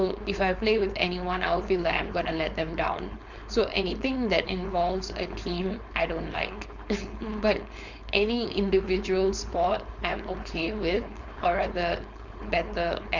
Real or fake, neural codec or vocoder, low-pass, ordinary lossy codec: fake; codec, 16 kHz, 4.8 kbps, FACodec; 7.2 kHz; Opus, 64 kbps